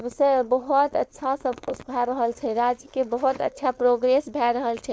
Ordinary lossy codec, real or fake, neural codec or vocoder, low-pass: none; fake; codec, 16 kHz, 4.8 kbps, FACodec; none